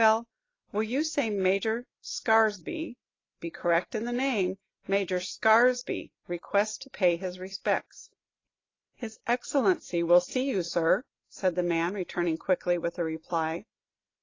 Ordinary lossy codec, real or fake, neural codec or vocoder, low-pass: AAC, 32 kbps; real; none; 7.2 kHz